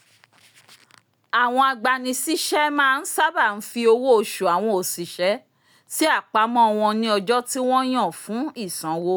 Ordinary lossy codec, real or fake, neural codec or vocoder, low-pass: none; real; none; none